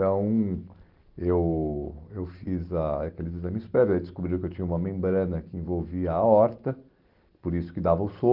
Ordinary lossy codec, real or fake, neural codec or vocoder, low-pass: Opus, 16 kbps; real; none; 5.4 kHz